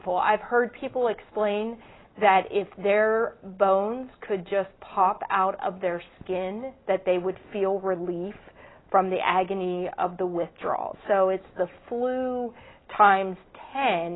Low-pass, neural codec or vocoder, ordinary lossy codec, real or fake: 7.2 kHz; none; AAC, 16 kbps; real